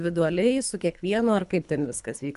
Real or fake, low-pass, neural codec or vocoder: fake; 10.8 kHz; codec, 24 kHz, 3 kbps, HILCodec